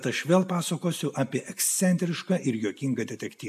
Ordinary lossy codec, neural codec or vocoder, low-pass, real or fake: AAC, 96 kbps; none; 14.4 kHz; real